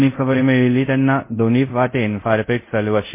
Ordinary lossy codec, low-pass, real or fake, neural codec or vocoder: MP3, 24 kbps; 3.6 kHz; fake; codec, 24 kHz, 0.5 kbps, DualCodec